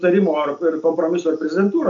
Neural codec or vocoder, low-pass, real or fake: none; 7.2 kHz; real